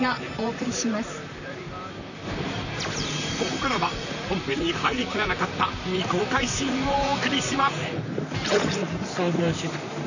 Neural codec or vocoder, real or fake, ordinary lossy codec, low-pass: vocoder, 44.1 kHz, 128 mel bands, Pupu-Vocoder; fake; none; 7.2 kHz